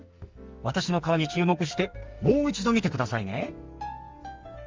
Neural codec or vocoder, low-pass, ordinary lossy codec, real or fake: codec, 44.1 kHz, 2.6 kbps, SNAC; 7.2 kHz; Opus, 32 kbps; fake